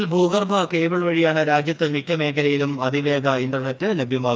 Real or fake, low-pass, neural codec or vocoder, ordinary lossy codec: fake; none; codec, 16 kHz, 2 kbps, FreqCodec, smaller model; none